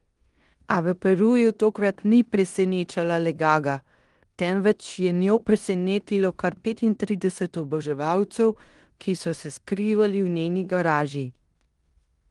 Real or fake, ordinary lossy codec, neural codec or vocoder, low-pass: fake; Opus, 24 kbps; codec, 16 kHz in and 24 kHz out, 0.9 kbps, LongCat-Audio-Codec, four codebook decoder; 10.8 kHz